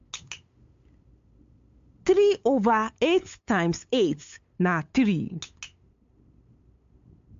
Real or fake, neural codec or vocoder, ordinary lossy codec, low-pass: fake; codec, 16 kHz, 8 kbps, FunCodec, trained on LibriTTS, 25 frames a second; MP3, 48 kbps; 7.2 kHz